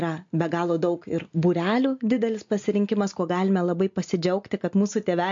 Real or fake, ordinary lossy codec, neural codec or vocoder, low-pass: real; MP3, 48 kbps; none; 7.2 kHz